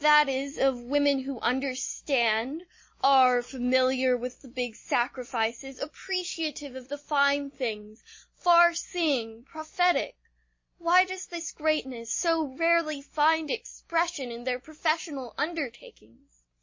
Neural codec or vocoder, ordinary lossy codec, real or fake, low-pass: none; MP3, 32 kbps; real; 7.2 kHz